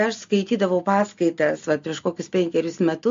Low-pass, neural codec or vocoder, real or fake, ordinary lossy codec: 7.2 kHz; none; real; MP3, 48 kbps